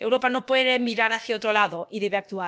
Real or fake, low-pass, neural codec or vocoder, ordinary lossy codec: fake; none; codec, 16 kHz, about 1 kbps, DyCAST, with the encoder's durations; none